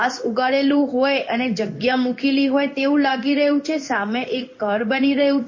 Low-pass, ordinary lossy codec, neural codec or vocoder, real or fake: 7.2 kHz; MP3, 32 kbps; codec, 16 kHz in and 24 kHz out, 1 kbps, XY-Tokenizer; fake